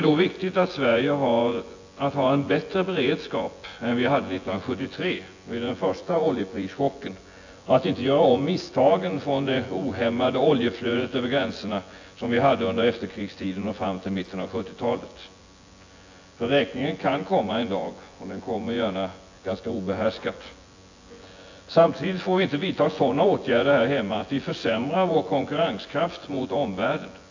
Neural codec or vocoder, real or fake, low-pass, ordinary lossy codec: vocoder, 24 kHz, 100 mel bands, Vocos; fake; 7.2 kHz; AAC, 48 kbps